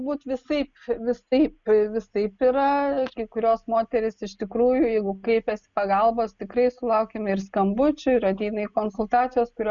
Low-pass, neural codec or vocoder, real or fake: 7.2 kHz; none; real